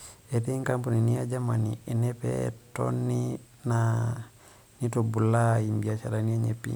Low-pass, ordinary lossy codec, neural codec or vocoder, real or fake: none; none; none; real